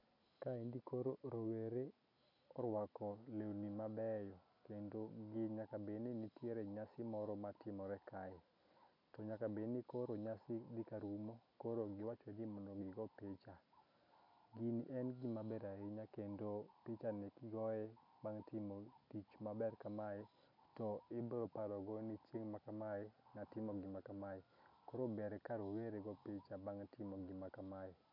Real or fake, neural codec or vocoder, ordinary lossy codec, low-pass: real; none; MP3, 48 kbps; 5.4 kHz